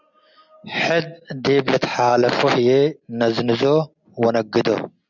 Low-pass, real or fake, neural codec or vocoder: 7.2 kHz; real; none